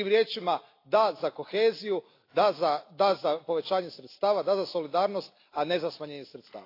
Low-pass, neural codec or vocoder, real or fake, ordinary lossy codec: 5.4 kHz; none; real; AAC, 32 kbps